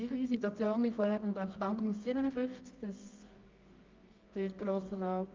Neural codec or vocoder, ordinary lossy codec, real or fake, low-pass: codec, 24 kHz, 0.9 kbps, WavTokenizer, medium music audio release; Opus, 24 kbps; fake; 7.2 kHz